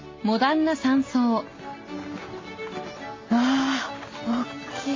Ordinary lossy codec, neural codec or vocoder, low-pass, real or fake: MP3, 32 kbps; none; 7.2 kHz; real